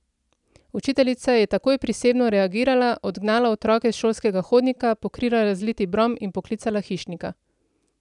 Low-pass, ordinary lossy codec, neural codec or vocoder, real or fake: 10.8 kHz; none; none; real